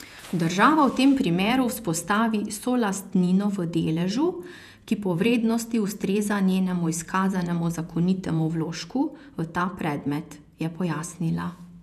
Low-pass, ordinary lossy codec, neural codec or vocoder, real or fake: 14.4 kHz; none; vocoder, 48 kHz, 128 mel bands, Vocos; fake